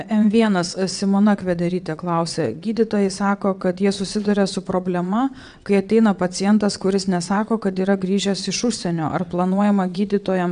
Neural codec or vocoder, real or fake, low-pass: vocoder, 22.05 kHz, 80 mel bands, WaveNeXt; fake; 9.9 kHz